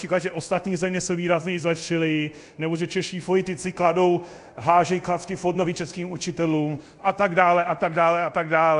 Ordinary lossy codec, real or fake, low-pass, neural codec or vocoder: AAC, 64 kbps; fake; 10.8 kHz; codec, 24 kHz, 0.5 kbps, DualCodec